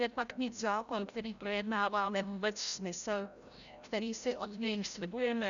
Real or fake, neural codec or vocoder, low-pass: fake; codec, 16 kHz, 0.5 kbps, FreqCodec, larger model; 7.2 kHz